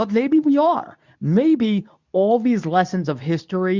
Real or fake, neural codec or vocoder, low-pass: fake; codec, 24 kHz, 0.9 kbps, WavTokenizer, medium speech release version 2; 7.2 kHz